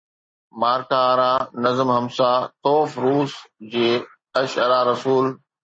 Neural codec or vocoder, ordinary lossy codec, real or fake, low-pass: none; MP3, 32 kbps; real; 9.9 kHz